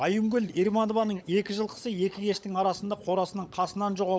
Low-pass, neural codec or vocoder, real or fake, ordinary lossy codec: none; codec, 16 kHz, 16 kbps, FunCodec, trained on LibriTTS, 50 frames a second; fake; none